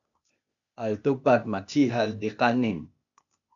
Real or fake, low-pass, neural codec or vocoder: fake; 7.2 kHz; codec, 16 kHz, 0.8 kbps, ZipCodec